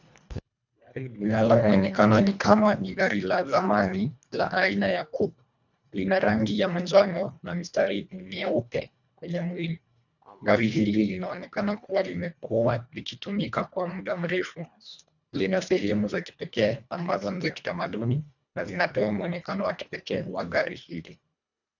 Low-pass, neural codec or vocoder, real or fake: 7.2 kHz; codec, 24 kHz, 1.5 kbps, HILCodec; fake